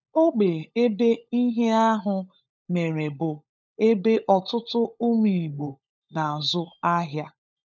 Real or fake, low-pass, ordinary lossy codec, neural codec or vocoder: fake; none; none; codec, 16 kHz, 16 kbps, FunCodec, trained on LibriTTS, 50 frames a second